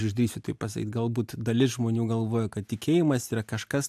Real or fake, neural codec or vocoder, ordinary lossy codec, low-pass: real; none; AAC, 96 kbps; 14.4 kHz